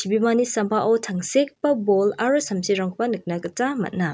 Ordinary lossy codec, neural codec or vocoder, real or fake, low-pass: none; none; real; none